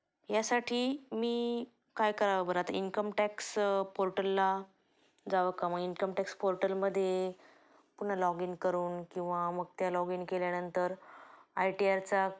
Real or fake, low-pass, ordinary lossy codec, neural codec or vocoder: real; none; none; none